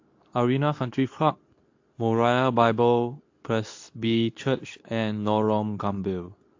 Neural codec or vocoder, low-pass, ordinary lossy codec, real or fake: codec, 24 kHz, 0.9 kbps, WavTokenizer, medium speech release version 2; 7.2 kHz; AAC, 48 kbps; fake